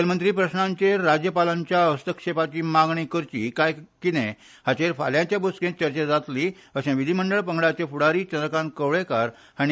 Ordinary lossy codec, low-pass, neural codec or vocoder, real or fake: none; none; none; real